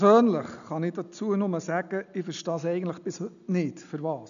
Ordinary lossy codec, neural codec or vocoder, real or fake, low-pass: none; none; real; 7.2 kHz